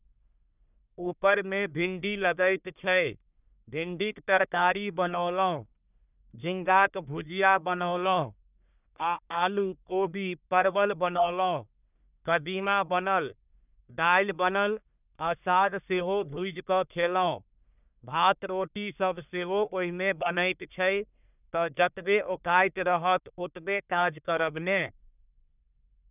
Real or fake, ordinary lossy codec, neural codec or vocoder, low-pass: fake; none; codec, 44.1 kHz, 1.7 kbps, Pupu-Codec; 3.6 kHz